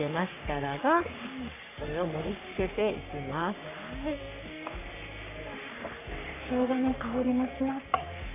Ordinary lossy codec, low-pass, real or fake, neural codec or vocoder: AAC, 24 kbps; 3.6 kHz; fake; codec, 44.1 kHz, 3.4 kbps, Pupu-Codec